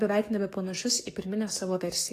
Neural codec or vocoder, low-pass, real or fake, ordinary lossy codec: codec, 44.1 kHz, 7.8 kbps, DAC; 14.4 kHz; fake; AAC, 48 kbps